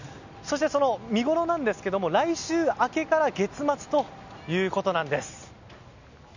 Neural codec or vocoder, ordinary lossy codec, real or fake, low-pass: none; none; real; 7.2 kHz